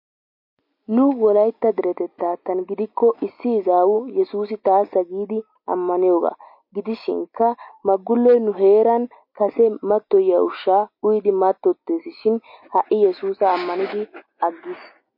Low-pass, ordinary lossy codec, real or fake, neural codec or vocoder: 5.4 kHz; MP3, 32 kbps; real; none